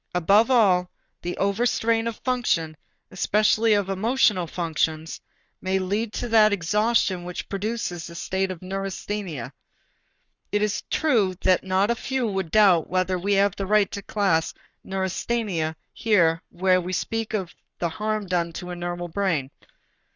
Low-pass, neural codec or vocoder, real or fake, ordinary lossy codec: 7.2 kHz; codec, 44.1 kHz, 7.8 kbps, Pupu-Codec; fake; Opus, 64 kbps